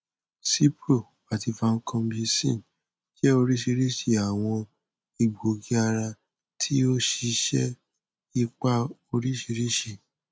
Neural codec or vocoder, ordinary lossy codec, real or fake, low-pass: none; none; real; none